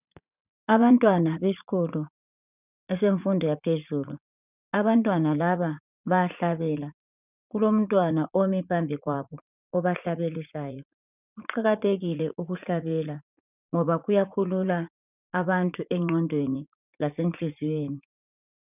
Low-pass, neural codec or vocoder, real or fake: 3.6 kHz; vocoder, 44.1 kHz, 80 mel bands, Vocos; fake